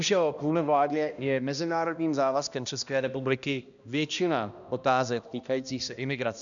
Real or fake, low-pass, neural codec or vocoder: fake; 7.2 kHz; codec, 16 kHz, 1 kbps, X-Codec, HuBERT features, trained on balanced general audio